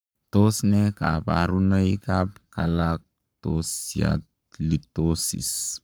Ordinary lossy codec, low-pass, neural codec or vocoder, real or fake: none; none; codec, 44.1 kHz, 7.8 kbps, Pupu-Codec; fake